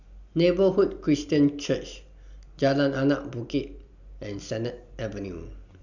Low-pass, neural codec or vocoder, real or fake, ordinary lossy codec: 7.2 kHz; none; real; none